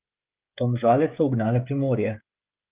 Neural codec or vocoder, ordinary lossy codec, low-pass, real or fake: codec, 16 kHz, 16 kbps, FreqCodec, smaller model; Opus, 32 kbps; 3.6 kHz; fake